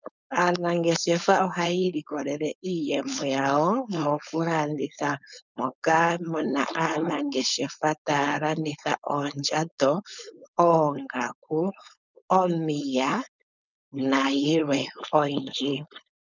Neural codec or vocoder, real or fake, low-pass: codec, 16 kHz, 4.8 kbps, FACodec; fake; 7.2 kHz